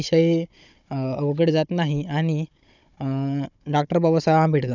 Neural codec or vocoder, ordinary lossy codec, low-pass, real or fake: codec, 16 kHz, 16 kbps, FunCodec, trained on Chinese and English, 50 frames a second; none; 7.2 kHz; fake